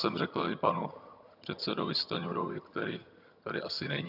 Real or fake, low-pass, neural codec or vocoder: fake; 5.4 kHz; vocoder, 22.05 kHz, 80 mel bands, HiFi-GAN